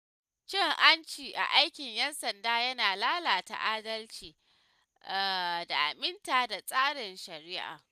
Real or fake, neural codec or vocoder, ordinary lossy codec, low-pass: real; none; none; 14.4 kHz